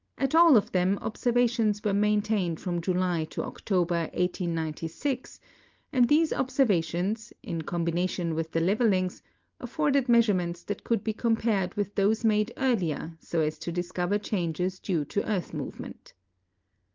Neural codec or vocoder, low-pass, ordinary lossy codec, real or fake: none; 7.2 kHz; Opus, 24 kbps; real